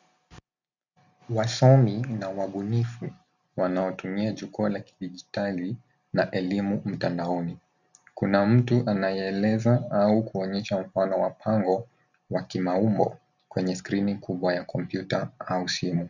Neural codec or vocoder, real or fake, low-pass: none; real; 7.2 kHz